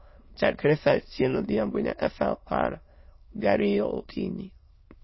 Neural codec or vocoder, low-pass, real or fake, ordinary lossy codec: autoencoder, 22.05 kHz, a latent of 192 numbers a frame, VITS, trained on many speakers; 7.2 kHz; fake; MP3, 24 kbps